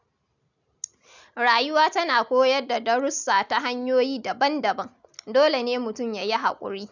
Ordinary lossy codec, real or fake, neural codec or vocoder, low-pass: none; real; none; 7.2 kHz